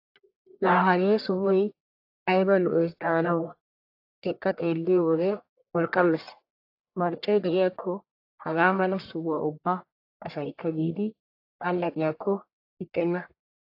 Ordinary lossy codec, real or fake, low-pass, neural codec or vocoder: AAC, 32 kbps; fake; 5.4 kHz; codec, 44.1 kHz, 1.7 kbps, Pupu-Codec